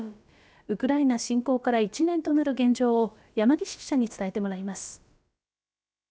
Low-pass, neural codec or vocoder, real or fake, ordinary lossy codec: none; codec, 16 kHz, about 1 kbps, DyCAST, with the encoder's durations; fake; none